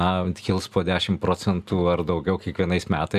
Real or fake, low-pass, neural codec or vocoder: real; 14.4 kHz; none